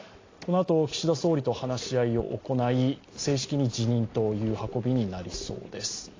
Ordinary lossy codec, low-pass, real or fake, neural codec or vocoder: AAC, 32 kbps; 7.2 kHz; real; none